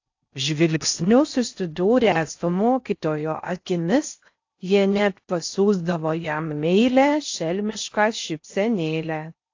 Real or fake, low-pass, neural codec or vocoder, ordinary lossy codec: fake; 7.2 kHz; codec, 16 kHz in and 24 kHz out, 0.6 kbps, FocalCodec, streaming, 4096 codes; AAC, 48 kbps